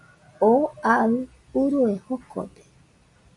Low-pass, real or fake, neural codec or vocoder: 10.8 kHz; real; none